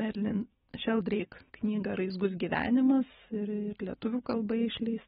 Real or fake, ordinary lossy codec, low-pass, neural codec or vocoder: real; AAC, 16 kbps; 19.8 kHz; none